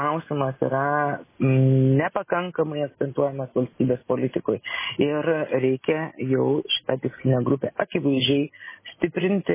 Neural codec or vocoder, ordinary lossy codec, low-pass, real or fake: none; MP3, 16 kbps; 3.6 kHz; real